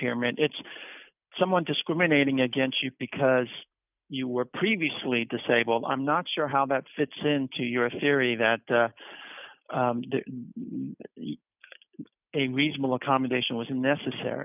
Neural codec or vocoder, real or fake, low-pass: none; real; 3.6 kHz